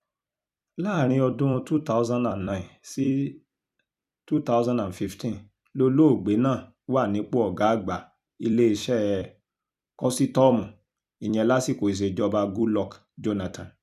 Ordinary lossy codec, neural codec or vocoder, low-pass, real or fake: none; vocoder, 44.1 kHz, 128 mel bands every 256 samples, BigVGAN v2; 14.4 kHz; fake